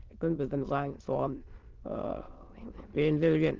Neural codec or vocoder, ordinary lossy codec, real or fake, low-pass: autoencoder, 22.05 kHz, a latent of 192 numbers a frame, VITS, trained on many speakers; Opus, 16 kbps; fake; 7.2 kHz